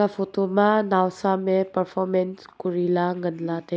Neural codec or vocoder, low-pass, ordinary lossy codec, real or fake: none; none; none; real